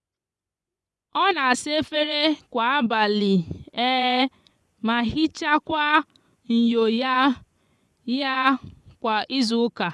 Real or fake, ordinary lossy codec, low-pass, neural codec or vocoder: fake; none; none; vocoder, 24 kHz, 100 mel bands, Vocos